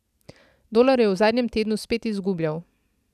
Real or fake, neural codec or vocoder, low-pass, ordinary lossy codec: real; none; 14.4 kHz; none